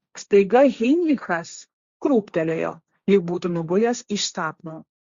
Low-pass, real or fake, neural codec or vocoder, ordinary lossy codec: 7.2 kHz; fake; codec, 16 kHz, 1.1 kbps, Voila-Tokenizer; Opus, 64 kbps